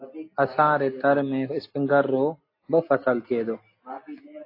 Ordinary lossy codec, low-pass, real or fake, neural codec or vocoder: AAC, 32 kbps; 5.4 kHz; real; none